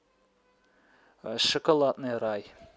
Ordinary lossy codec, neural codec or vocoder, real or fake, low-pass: none; none; real; none